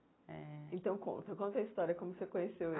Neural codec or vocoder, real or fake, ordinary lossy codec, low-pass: none; real; AAC, 16 kbps; 7.2 kHz